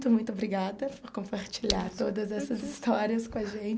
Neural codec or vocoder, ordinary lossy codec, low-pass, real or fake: none; none; none; real